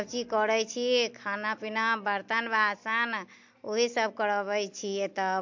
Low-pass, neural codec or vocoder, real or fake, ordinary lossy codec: 7.2 kHz; none; real; MP3, 48 kbps